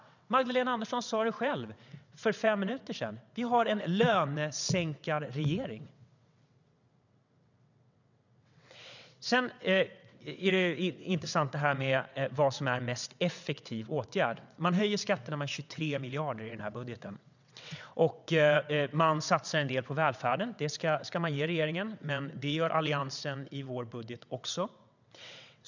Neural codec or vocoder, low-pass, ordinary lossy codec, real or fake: vocoder, 22.05 kHz, 80 mel bands, WaveNeXt; 7.2 kHz; none; fake